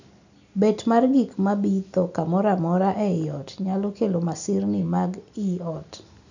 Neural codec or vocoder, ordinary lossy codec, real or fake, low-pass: none; none; real; 7.2 kHz